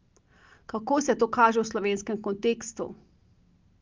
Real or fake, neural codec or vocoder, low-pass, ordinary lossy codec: real; none; 7.2 kHz; Opus, 24 kbps